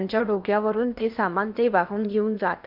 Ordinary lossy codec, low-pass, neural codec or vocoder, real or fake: none; 5.4 kHz; codec, 16 kHz in and 24 kHz out, 0.8 kbps, FocalCodec, streaming, 65536 codes; fake